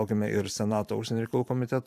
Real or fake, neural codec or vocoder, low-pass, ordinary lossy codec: fake; vocoder, 44.1 kHz, 128 mel bands every 512 samples, BigVGAN v2; 14.4 kHz; AAC, 96 kbps